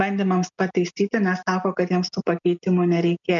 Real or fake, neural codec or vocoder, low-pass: real; none; 7.2 kHz